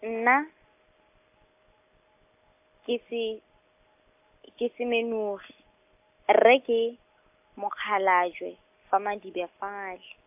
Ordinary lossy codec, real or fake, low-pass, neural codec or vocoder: AAC, 32 kbps; real; 3.6 kHz; none